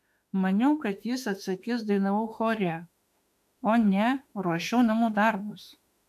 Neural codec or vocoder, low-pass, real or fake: autoencoder, 48 kHz, 32 numbers a frame, DAC-VAE, trained on Japanese speech; 14.4 kHz; fake